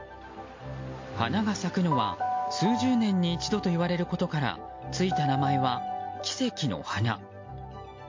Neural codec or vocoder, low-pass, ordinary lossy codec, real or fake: none; 7.2 kHz; MP3, 48 kbps; real